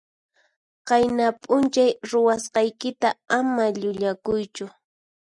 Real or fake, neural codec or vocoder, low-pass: real; none; 10.8 kHz